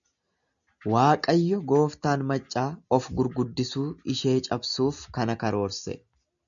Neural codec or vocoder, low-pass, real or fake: none; 7.2 kHz; real